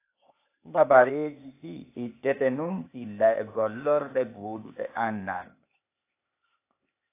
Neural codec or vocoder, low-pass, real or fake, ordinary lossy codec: codec, 16 kHz, 0.8 kbps, ZipCodec; 3.6 kHz; fake; AAC, 24 kbps